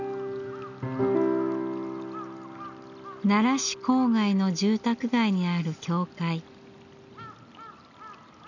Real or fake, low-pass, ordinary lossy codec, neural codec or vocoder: real; 7.2 kHz; none; none